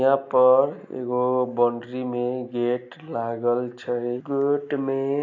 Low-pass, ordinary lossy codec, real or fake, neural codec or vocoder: 7.2 kHz; AAC, 48 kbps; real; none